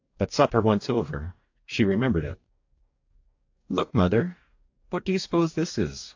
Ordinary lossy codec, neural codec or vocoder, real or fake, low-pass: AAC, 48 kbps; codec, 44.1 kHz, 2.6 kbps, DAC; fake; 7.2 kHz